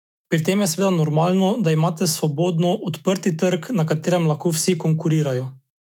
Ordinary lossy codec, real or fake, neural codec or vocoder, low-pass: none; fake; autoencoder, 48 kHz, 128 numbers a frame, DAC-VAE, trained on Japanese speech; 19.8 kHz